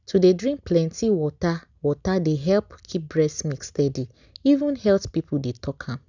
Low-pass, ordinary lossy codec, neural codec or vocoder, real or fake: 7.2 kHz; none; none; real